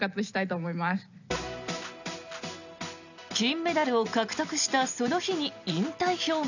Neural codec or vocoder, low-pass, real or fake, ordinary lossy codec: none; 7.2 kHz; real; none